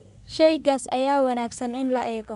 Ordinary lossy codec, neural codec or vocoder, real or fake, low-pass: none; codec, 24 kHz, 1 kbps, SNAC; fake; 10.8 kHz